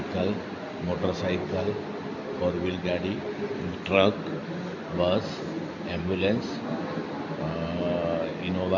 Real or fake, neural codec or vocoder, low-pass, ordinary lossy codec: real; none; 7.2 kHz; none